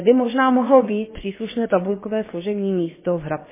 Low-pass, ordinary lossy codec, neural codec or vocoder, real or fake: 3.6 kHz; MP3, 16 kbps; codec, 16 kHz, about 1 kbps, DyCAST, with the encoder's durations; fake